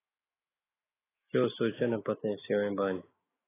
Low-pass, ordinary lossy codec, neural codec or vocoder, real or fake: 3.6 kHz; AAC, 16 kbps; autoencoder, 48 kHz, 128 numbers a frame, DAC-VAE, trained on Japanese speech; fake